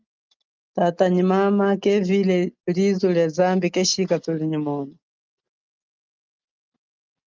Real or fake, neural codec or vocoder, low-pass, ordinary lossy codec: real; none; 7.2 kHz; Opus, 24 kbps